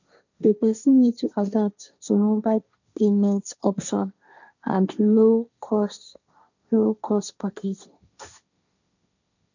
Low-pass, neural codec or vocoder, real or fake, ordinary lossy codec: 7.2 kHz; codec, 16 kHz, 1.1 kbps, Voila-Tokenizer; fake; none